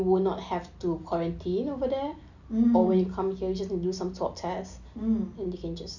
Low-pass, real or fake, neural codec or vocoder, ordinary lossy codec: 7.2 kHz; real; none; none